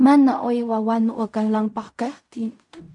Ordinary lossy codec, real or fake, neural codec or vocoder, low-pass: MP3, 64 kbps; fake; codec, 16 kHz in and 24 kHz out, 0.4 kbps, LongCat-Audio-Codec, fine tuned four codebook decoder; 10.8 kHz